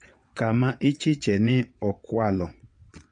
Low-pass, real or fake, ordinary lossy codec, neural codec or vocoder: 9.9 kHz; fake; MP3, 48 kbps; vocoder, 22.05 kHz, 80 mel bands, WaveNeXt